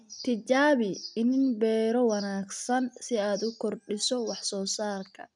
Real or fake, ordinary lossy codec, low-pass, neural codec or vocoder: real; none; 10.8 kHz; none